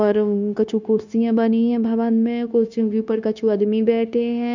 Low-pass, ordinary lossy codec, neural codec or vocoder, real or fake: 7.2 kHz; none; codec, 16 kHz, 0.9 kbps, LongCat-Audio-Codec; fake